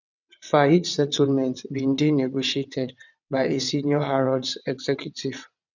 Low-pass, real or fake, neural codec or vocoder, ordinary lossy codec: 7.2 kHz; fake; vocoder, 22.05 kHz, 80 mel bands, WaveNeXt; none